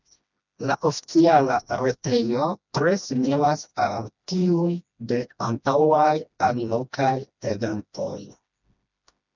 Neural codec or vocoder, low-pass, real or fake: codec, 16 kHz, 1 kbps, FreqCodec, smaller model; 7.2 kHz; fake